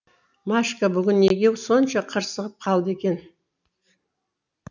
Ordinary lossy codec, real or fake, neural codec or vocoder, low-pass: none; real; none; 7.2 kHz